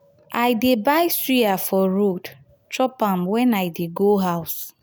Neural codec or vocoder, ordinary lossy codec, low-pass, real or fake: none; none; none; real